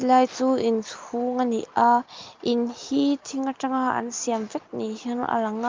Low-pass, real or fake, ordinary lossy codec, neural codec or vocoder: 7.2 kHz; real; Opus, 24 kbps; none